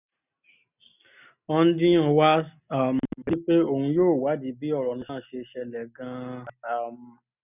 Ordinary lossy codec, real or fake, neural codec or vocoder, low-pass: none; real; none; 3.6 kHz